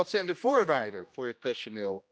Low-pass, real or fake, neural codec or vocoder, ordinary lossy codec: none; fake; codec, 16 kHz, 1 kbps, X-Codec, HuBERT features, trained on general audio; none